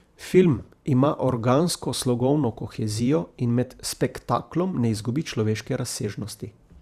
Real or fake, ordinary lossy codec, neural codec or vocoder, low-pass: fake; Opus, 64 kbps; vocoder, 44.1 kHz, 128 mel bands every 512 samples, BigVGAN v2; 14.4 kHz